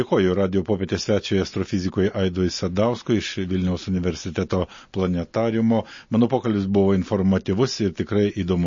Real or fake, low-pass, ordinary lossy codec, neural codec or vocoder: real; 7.2 kHz; MP3, 32 kbps; none